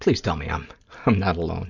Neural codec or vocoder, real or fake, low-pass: none; real; 7.2 kHz